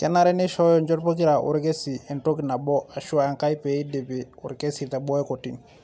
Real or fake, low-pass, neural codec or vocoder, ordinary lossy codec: real; none; none; none